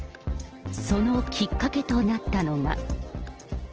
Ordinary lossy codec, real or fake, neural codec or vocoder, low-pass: Opus, 16 kbps; real; none; 7.2 kHz